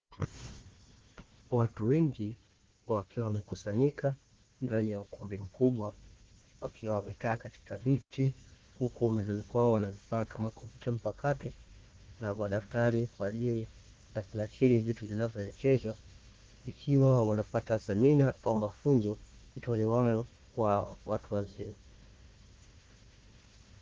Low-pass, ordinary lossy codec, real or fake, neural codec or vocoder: 7.2 kHz; Opus, 16 kbps; fake; codec, 16 kHz, 1 kbps, FunCodec, trained on Chinese and English, 50 frames a second